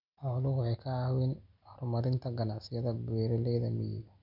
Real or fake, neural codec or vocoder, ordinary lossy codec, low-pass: real; none; none; 5.4 kHz